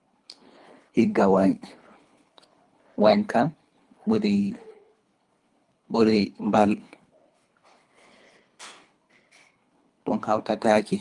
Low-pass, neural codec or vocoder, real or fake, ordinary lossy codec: 10.8 kHz; codec, 24 kHz, 3 kbps, HILCodec; fake; Opus, 32 kbps